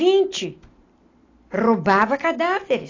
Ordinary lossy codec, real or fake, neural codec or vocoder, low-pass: AAC, 32 kbps; real; none; 7.2 kHz